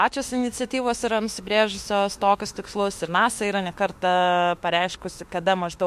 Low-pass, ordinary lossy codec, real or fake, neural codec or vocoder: 14.4 kHz; MP3, 64 kbps; fake; autoencoder, 48 kHz, 32 numbers a frame, DAC-VAE, trained on Japanese speech